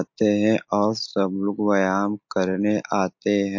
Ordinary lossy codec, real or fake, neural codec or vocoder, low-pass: MP3, 48 kbps; real; none; 7.2 kHz